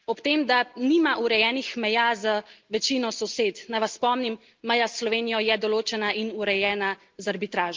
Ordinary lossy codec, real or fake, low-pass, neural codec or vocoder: Opus, 32 kbps; real; 7.2 kHz; none